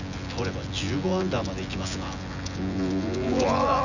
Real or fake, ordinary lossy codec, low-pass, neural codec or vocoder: fake; none; 7.2 kHz; vocoder, 24 kHz, 100 mel bands, Vocos